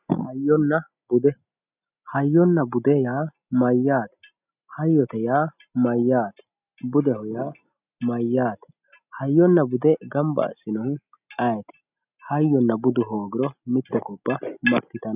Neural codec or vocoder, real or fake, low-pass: none; real; 3.6 kHz